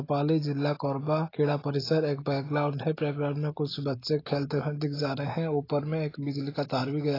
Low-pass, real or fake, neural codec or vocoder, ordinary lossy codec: 5.4 kHz; real; none; AAC, 24 kbps